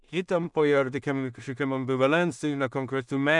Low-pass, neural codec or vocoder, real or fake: 10.8 kHz; codec, 16 kHz in and 24 kHz out, 0.4 kbps, LongCat-Audio-Codec, two codebook decoder; fake